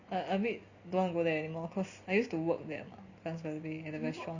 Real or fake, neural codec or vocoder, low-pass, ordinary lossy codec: real; none; 7.2 kHz; Opus, 64 kbps